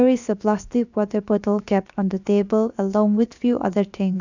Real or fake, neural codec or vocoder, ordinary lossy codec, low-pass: fake; codec, 16 kHz, 0.7 kbps, FocalCodec; none; 7.2 kHz